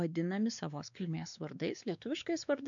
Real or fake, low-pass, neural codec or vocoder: fake; 7.2 kHz; codec, 16 kHz, 2 kbps, X-Codec, WavLM features, trained on Multilingual LibriSpeech